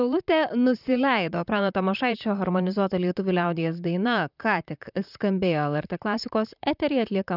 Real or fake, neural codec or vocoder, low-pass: fake; vocoder, 44.1 kHz, 128 mel bands, Pupu-Vocoder; 5.4 kHz